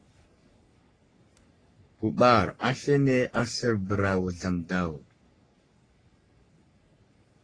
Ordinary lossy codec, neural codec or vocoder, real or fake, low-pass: AAC, 32 kbps; codec, 44.1 kHz, 3.4 kbps, Pupu-Codec; fake; 9.9 kHz